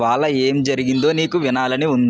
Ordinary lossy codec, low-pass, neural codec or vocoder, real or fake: none; none; none; real